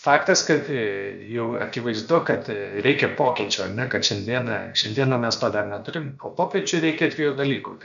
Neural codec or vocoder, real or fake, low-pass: codec, 16 kHz, about 1 kbps, DyCAST, with the encoder's durations; fake; 7.2 kHz